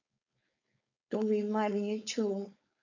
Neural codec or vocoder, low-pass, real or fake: codec, 16 kHz, 4.8 kbps, FACodec; 7.2 kHz; fake